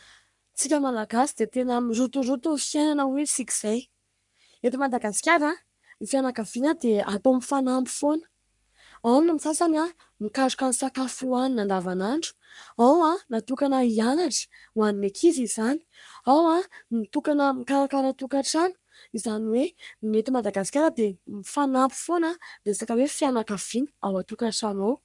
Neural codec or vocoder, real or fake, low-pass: codec, 44.1 kHz, 3.4 kbps, Pupu-Codec; fake; 10.8 kHz